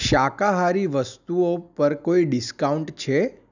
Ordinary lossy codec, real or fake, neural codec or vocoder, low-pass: none; real; none; 7.2 kHz